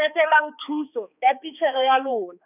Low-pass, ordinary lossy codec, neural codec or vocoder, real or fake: 3.6 kHz; none; codec, 16 kHz, 2 kbps, X-Codec, HuBERT features, trained on balanced general audio; fake